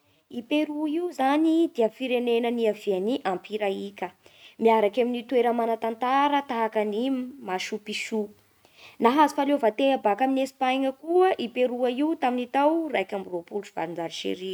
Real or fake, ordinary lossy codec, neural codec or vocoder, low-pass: real; none; none; none